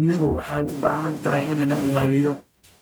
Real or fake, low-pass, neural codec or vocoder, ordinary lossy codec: fake; none; codec, 44.1 kHz, 0.9 kbps, DAC; none